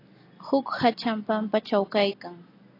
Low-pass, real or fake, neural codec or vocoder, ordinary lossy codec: 5.4 kHz; real; none; AAC, 32 kbps